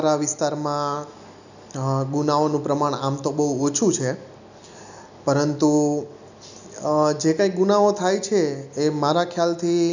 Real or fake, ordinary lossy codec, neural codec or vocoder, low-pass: real; none; none; 7.2 kHz